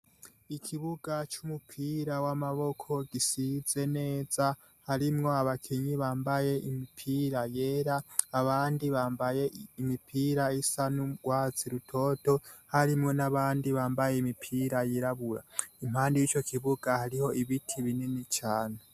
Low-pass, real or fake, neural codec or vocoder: 14.4 kHz; real; none